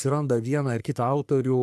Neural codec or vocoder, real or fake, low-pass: codec, 44.1 kHz, 3.4 kbps, Pupu-Codec; fake; 14.4 kHz